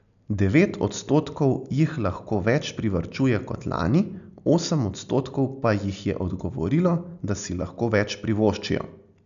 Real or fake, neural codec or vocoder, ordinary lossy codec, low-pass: real; none; none; 7.2 kHz